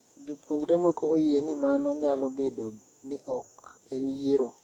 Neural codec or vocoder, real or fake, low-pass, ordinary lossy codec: codec, 44.1 kHz, 2.6 kbps, DAC; fake; 19.8 kHz; MP3, 96 kbps